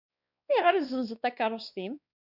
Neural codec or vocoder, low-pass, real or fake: codec, 16 kHz, 2 kbps, X-Codec, WavLM features, trained on Multilingual LibriSpeech; 5.4 kHz; fake